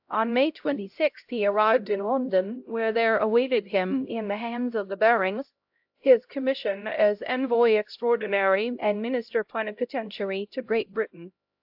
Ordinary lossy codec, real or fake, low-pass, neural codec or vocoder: AAC, 48 kbps; fake; 5.4 kHz; codec, 16 kHz, 0.5 kbps, X-Codec, HuBERT features, trained on LibriSpeech